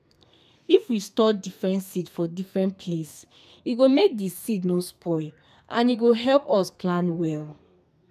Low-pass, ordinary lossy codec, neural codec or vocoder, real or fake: 14.4 kHz; none; codec, 32 kHz, 1.9 kbps, SNAC; fake